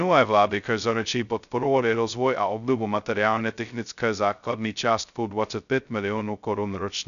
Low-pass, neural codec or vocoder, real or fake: 7.2 kHz; codec, 16 kHz, 0.2 kbps, FocalCodec; fake